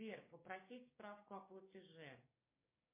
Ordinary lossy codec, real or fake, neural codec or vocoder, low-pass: MP3, 24 kbps; fake; codec, 16 kHz, 6 kbps, DAC; 3.6 kHz